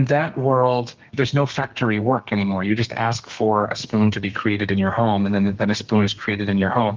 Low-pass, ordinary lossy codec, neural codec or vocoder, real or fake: 7.2 kHz; Opus, 32 kbps; codec, 32 kHz, 1.9 kbps, SNAC; fake